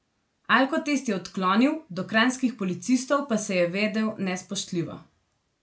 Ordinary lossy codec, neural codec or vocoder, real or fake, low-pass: none; none; real; none